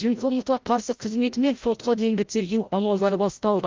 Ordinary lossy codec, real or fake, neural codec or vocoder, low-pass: Opus, 32 kbps; fake; codec, 16 kHz, 0.5 kbps, FreqCodec, larger model; 7.2 kHz